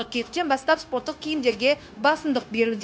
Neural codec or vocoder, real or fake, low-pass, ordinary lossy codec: codec, 16 kHz, 0.9 kbps, LongCat-Audio-Codec; fake; none; none